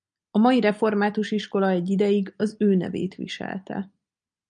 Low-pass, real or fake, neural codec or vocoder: 9.9 kHz; real; none